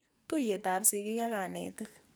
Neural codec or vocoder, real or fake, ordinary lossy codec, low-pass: codec, 44.1 kHz, 2.6 kbps, SNAC; fake; none; none